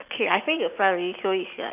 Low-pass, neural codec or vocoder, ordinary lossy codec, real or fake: 3.6 kHz; none; none; real